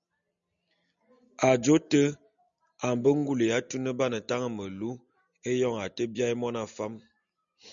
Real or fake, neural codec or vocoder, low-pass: real; none; 7.2 kHz